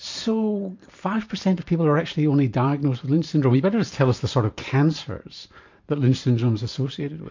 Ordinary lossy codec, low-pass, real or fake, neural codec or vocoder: MP3, 48 kbps; 7.2 kHz; fake; vocoder, 44.1 kHz, 128 mel bands every 512 samples, BigVGAN v2